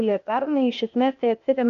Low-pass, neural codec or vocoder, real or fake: 7.2 kHz; codec, 16 kHz, 0.8 kbps, ZipCodec; fake